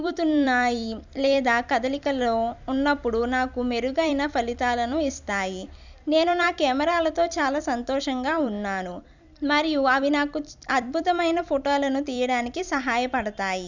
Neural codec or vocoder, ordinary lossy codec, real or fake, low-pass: vocoder, 44.1 kHz, 128 mel bands every 256 samples, BigVGAN v2; none; fake; 7.2 kHz